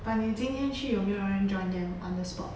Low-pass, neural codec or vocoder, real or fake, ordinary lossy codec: none; none; real; none